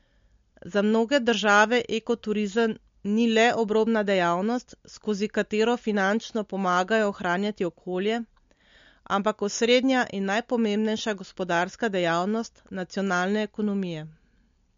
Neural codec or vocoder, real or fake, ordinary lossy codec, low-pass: none; real; MP3, 48 kbps; 7.2 kHz